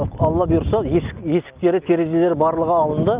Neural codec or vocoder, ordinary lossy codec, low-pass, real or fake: none; Opus, 32 kbps; 3.6 kHz; real